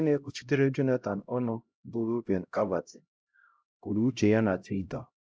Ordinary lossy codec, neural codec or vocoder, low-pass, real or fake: none; codec, 16 kHz, 0.5 kbps, X-Codec, HuBERT features, trained on LibriSpeech; none; fake